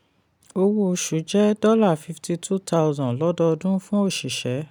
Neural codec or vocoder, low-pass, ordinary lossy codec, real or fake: none; 19.8 kHz; none; real